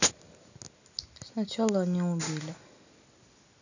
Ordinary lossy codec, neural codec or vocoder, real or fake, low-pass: none; none; real; 7.2 kHz